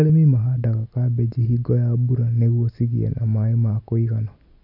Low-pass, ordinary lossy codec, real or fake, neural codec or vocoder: 5.4 kHz; none; real; none